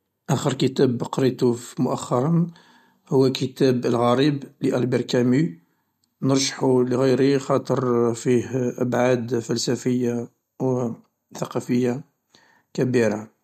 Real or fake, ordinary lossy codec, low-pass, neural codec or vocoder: real; MP3, 64 kbps; 14.4 kHz; none